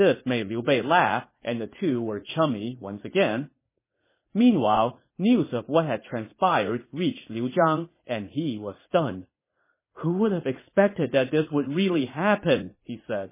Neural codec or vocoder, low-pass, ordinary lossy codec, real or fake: vocoder, 44.1 kHz, 80 mel bands, Vocos; 3.6 kHz; MP3, 16 kbps; fake